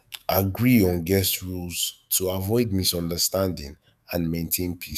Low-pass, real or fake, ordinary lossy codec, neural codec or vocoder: 14.4 kHz; fake; none; autoencoder, 48 kHz, 128 numbers a frame, DAC-VAE, trained on Japanese speech